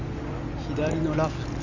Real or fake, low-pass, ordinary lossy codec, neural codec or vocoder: real; 7.2 kHz; none; none